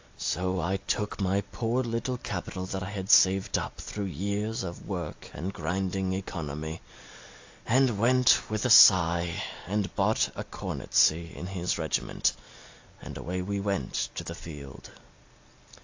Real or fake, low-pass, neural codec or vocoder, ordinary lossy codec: real; 7.2 kHz; none; AAC, 48 kbps